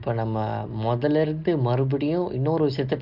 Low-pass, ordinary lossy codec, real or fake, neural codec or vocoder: 5.4 kHz; Opus, 32 kbps; real; none